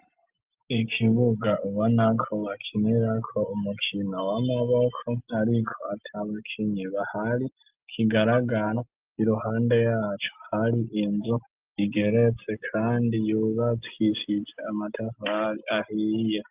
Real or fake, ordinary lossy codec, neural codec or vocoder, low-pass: real; Opus, 24 kbps; none; 3.6 kHz